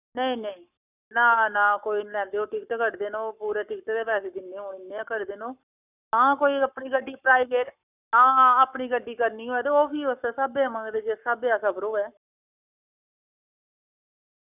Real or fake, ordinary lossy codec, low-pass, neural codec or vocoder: fake; none; 3.6 kHz; codec, 44.1 kHz, 7.8 kbps, Pupu-Codec